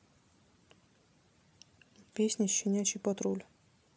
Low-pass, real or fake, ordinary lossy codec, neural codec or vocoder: none; real; none; none